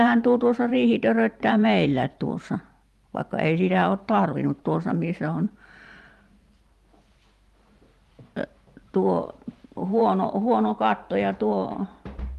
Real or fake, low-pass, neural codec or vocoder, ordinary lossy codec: fake; 14.4 kHz; vocoder, 44.1 kHz, 128 mel bands every 512 samples, BigVGAN v2; Opus, 32 kbps